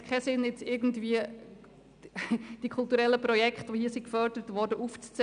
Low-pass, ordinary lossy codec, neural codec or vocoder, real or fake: 9.9 kHz; none; none; real